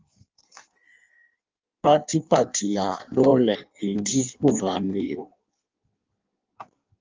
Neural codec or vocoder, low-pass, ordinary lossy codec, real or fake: codec, 16 kHz in and 24 kHz out, 1.1 kbps, FireRedTTS-2 codec; 7.2 kHz; Opus, 24 kbps; fake